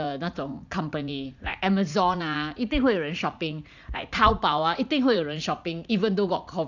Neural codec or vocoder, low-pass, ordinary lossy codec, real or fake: vocoder, 44.1 kHz, 80 mel bands, Vocos; 7.2 kHz; none; fake